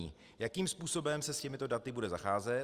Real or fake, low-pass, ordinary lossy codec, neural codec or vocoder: real; 14.4 kHz; Opus, 32 kbps; none